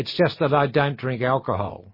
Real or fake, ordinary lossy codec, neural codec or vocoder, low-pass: real; MP3, 24 kbps; none; 5.4 kHz